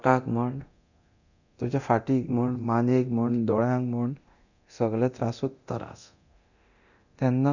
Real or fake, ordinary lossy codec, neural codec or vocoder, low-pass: fake; none; codec, 24 kHz, 0.9 kbps, DualCodec; 7.2 kHz